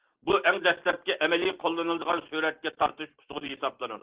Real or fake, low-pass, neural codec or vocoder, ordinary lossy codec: real; 3.6 kHz; none; Opus, 16 kbps